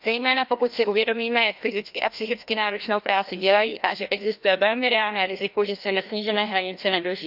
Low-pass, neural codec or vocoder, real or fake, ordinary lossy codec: 5.4 kHz; codec, 16 kHz, 1 kbps, FreqCodec, larger model; fake; none